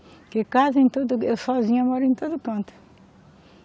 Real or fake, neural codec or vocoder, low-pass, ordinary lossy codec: real; none; none; none